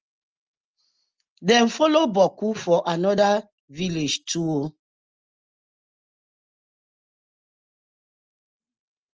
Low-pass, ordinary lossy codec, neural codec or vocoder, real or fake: 7.2 kHz; Opus, 24 kbps; none; real